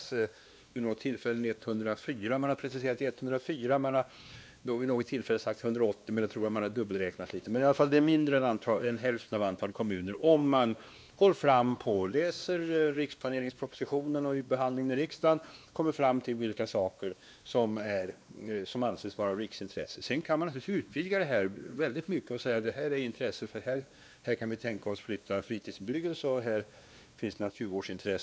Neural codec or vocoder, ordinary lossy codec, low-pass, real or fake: codec, 16 kHz, 2 kbps, X-Codec, WavLM features, trained on Multilingual LibriSpeech; none; none; fake